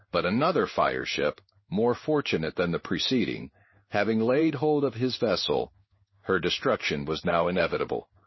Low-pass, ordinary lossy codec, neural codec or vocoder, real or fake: 7.2 kHz; MP3, 24 kbps; codec, 16 kHz in and 24 kHz out, 1 kbps, XY-Tokenizer; fake